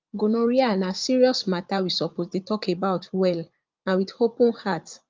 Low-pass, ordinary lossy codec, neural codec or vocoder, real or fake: 7.2 kHz; Opus, 32 kbps; vocoder, 44.1 kHz, 80 mel bands, Vocos; fake